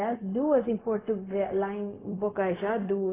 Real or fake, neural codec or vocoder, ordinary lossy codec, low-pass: fake; codec, 16 kHz, 0.4 kbps, LongCat-Audio-Codec; AAC, 16 kbps; 3.6 kHz